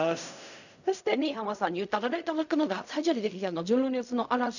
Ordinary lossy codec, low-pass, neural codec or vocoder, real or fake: none; 7.2 kHz; codec, 16 kHz in and 24 kHz out, 0.4 kbps, LongCat-Audio-Codec, fine tuned four codebook decoder; fake